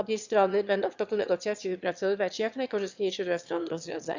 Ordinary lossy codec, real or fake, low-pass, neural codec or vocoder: Opus, 64 kbps; fake; 7.2 kHz; autoencoder, 22.05 kHz, a latent of 192 numbers a frame, VITS, trained on one speaker